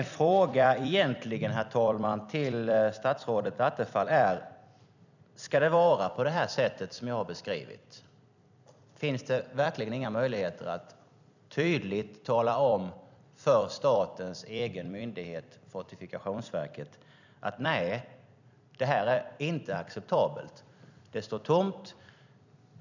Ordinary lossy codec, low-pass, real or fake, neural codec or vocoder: none; 7.2 kHz; fake; vocoder, 44.1 kHz, 128 mel bands every 256 samples, BigVGAN v2